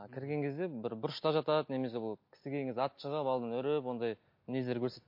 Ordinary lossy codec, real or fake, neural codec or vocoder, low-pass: MP3, 32 kbps; real; none; 5.4 kHz